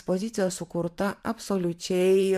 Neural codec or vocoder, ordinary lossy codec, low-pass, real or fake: vocoder, 44.1 kHz, 128 mel bands every 512 samples, BigVGAN v2; MP3, 96 kbps; 14.4 kHz; fake